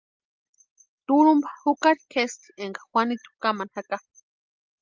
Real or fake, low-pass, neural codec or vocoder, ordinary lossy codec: real; 7.2 kHz; none; Opus, 24 kbps